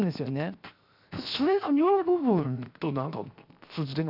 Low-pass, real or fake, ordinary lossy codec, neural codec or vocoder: 5.4 kHz; fake; none; codec, 16 kHz, 0.7 kbps, FocalCodec